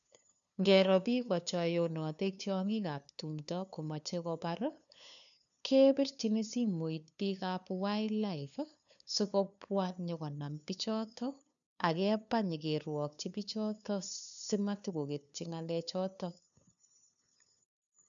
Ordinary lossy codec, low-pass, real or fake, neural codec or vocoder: MP3, 96 kbps; 7.2 kHz; fake; codec, 16 kHz, 2 kbps, FunCodec, trained on LibriTTS, 25 frames a second